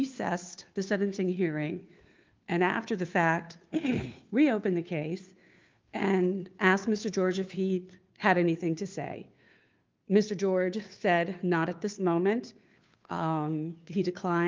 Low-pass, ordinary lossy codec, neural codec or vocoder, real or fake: 7.2 kHz; Opus, 24 kbps; codec, 16 kHz, 2 kbps, FunCodec, trained on Chinese and English, 25 frames a second; fake